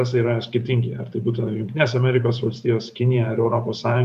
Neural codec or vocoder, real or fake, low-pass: vocoder, 44.1 kHz, 128 mel bands every 512 samples, BigVGAN v2; fake; 14.4 kHz